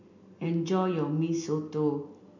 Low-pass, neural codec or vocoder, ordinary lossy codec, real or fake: 7.2 kHz; none; none; real